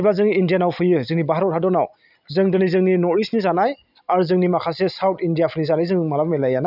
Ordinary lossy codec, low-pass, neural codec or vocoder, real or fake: none; 5.4 kHz; none; real